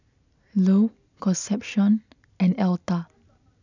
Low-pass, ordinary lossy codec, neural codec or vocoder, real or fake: 7.2 kHz; none; none; real